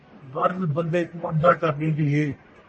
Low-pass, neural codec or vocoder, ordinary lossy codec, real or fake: 10.8 kHz; codec, 44.1 kHz, 1.7 kbps, Pupu-Codec; MP3, 32 kbps; fake